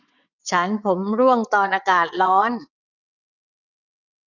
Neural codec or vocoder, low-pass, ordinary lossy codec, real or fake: vocoder, 22.05 kHz, 80 mel bands, WaveNeXt; 7.2 kHz; none; fake